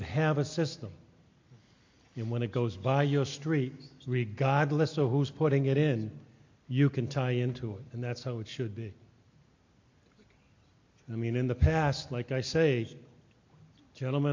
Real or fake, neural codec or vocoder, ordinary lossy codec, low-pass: real; none; MP3, 48 kbps; 7.2 kHz